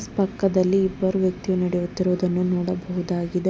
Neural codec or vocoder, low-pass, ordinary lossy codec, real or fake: none; none; none; real